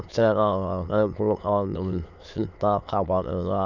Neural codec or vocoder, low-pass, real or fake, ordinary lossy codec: autoencoder, 22.05 kHz, a latent of 192 numbers a frame, VITS, trained on many speakers; 7.2 kHz; fake; none